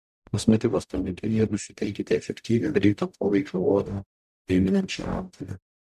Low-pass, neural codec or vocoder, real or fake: 14.4 kHz; codec, 44.1 kHz, 0.9 kbps, DAC; fake